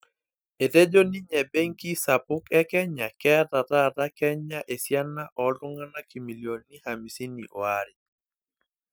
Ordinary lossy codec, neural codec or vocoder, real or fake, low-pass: none; none; real; none